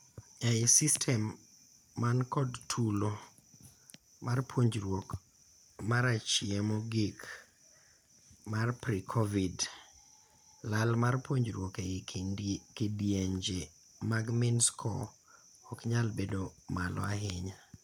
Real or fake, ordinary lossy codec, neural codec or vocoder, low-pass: real; none; none; 19.8 kHz